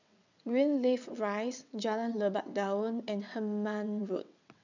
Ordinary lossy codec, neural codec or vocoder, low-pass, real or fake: none; vocoder, 22.05 kHz, 80 mel bands, WaveNeXt; 7.2 kHz; fake